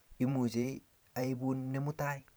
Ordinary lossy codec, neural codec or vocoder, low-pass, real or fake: none; vocoder, 44.1 kHz, 128 mel bands every 512 samples, BigVGAN v2; none; fake